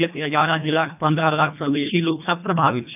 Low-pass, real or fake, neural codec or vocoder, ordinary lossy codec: 3.6 kHz; fake; codec, 24 kHz, 1.5 kbps, HILCodec; none